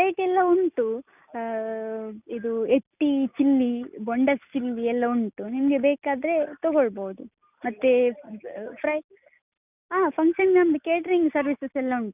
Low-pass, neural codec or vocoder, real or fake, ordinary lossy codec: 3.6 kHz; none; real; none